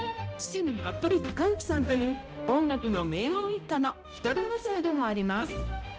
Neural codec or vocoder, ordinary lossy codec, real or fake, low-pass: codec, 16 kHz, 0.5 kbps, X-Codec, HuBERT features, trained on balanced general audio; none; fake; none